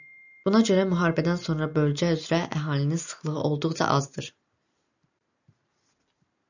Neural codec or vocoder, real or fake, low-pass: none; real; 7.2 kHz